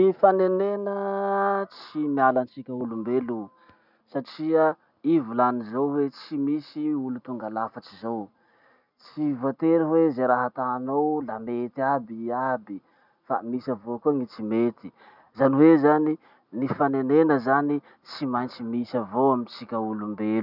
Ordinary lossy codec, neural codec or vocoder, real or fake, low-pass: none; none; real; 5.4 kHz